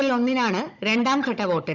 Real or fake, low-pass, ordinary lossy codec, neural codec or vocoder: fake; 7.2 kHz; none; codec, 16 kHz, 16 kbps, FunCodec, trained on Chinese and English, 50 frames a second